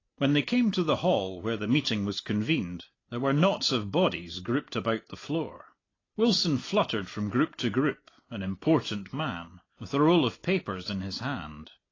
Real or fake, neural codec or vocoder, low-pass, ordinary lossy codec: real; none; 7.2 kHz; AAC, 32 kbps